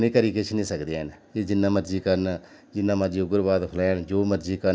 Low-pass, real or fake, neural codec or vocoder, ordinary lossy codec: none; real; none; none